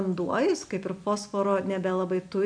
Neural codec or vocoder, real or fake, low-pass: none; real; 9.9 kHz